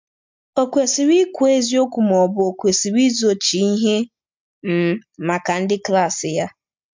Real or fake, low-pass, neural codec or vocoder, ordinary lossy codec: real; 7.2 kHz; none; MP3, 64 kbps